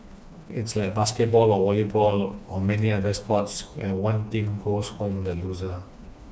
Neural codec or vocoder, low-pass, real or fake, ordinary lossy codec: codec, 16 kHz, 2 kbps, FreqCodec, smaller model; none; fake; none